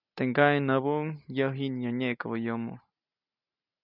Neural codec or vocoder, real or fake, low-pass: none; real; 5.4 kHz